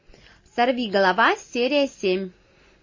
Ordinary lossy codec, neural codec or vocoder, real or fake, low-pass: MP3, 32 kbps; none; real; 7.2 kHz